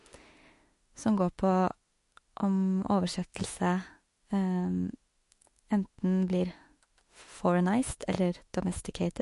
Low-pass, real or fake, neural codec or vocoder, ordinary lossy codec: 14.4 kHz; fake; autoencoder, 48 kHz, 32 numbers a frame, DAC-VAE, trained on Japanese speech; MP3, 48 kbps